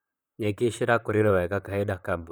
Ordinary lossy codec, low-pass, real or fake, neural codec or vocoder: none; none; fake; vocoder, 44.1 kHz, 128 mel bands, Pupu-Vocoder